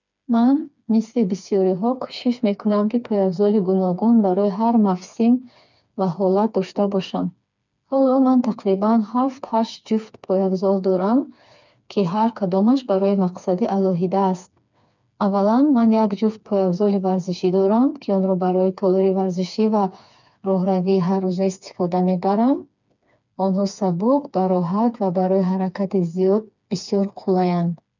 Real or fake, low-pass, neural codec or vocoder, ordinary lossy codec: fake; 7.2 kHz; codec, 16 kHz, 4 kbps, FreqCodec, smaller model; none